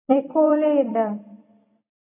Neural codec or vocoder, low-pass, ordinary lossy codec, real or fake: vocoder, 44.1 kHz, 128 mel bands every 256 samples, BigVGAN v2; 3.6 kHz; AAC, 32 kbps; fake